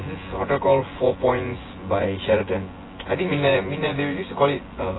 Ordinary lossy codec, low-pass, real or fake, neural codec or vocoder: AAC, 16 kbps; 7.2 kHz; fake; vocoder, 24 kHz, 100 mel bands, Vocos